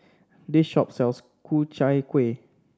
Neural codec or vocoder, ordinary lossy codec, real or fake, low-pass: none; none; real; none